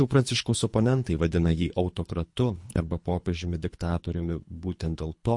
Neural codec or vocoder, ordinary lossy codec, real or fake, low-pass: codec, 24 kHz, 3 kbps, HILCodec; MP3, 48 kbps; fake; 10.8 kHz